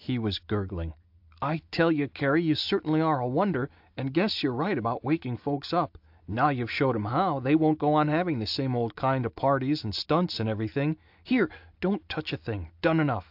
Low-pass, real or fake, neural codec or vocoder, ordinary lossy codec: 5.4 kHz; real; none; AAC, 48 kbps